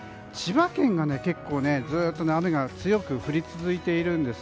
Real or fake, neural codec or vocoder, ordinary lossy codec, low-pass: real; none; none; none